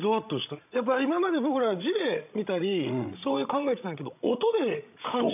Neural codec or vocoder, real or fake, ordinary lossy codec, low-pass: codec, 16 kHz, 16 kbps, FreqCodec, larger model; fake; none; 3.6 kHz